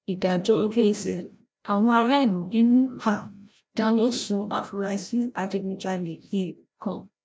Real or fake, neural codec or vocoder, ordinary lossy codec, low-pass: fake; codec, 16 kHz, 0.5 kbps, FreqCodec, larger model; none; none